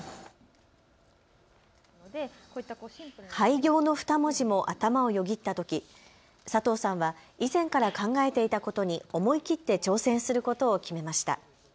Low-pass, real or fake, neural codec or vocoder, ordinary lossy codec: none; real; none; none